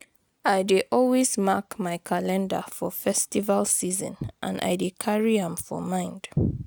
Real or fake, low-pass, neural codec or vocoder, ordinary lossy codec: real; none; none; none